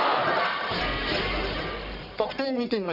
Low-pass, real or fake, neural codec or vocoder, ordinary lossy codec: 5.4 kHz; fake; codec, 44.1 kHz, 1.7 kbps, Pupu-Codec; none